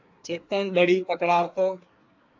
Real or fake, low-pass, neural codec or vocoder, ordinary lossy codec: fake; 7.2 kHz; codec, 24 kHz, 1 kbps, SNAC; AAC, 48 kbps